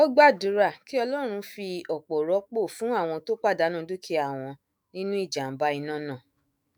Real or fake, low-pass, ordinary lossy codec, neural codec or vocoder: fake; none; none; autoencoder, 48 kHz, 128 numbers a frame, DAC-VAE, trained on Japanese speech